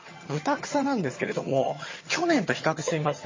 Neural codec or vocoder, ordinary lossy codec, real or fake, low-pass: vocoder, 22.05 kHz, 80 mel bands, HiFi-GAN; MP3, 32 kbps; fake; 7.2 kHz